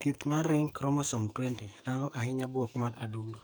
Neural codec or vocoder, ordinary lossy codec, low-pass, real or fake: codec, 44.1 kHz, 2.6 kbps, SNAC; none; none; fake